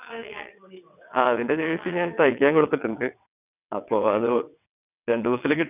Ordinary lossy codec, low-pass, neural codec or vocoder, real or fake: none; 3.6 kHz; vocoder, 22.05 kHz, 80 mel bands, WaveNeXt; fake